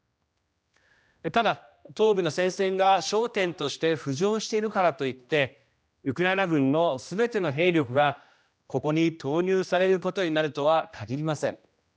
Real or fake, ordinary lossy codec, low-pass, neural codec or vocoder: fake; none; none; codec, 16 kHz, 1 kbps, X-Codec, HuBERT features, trained on general audio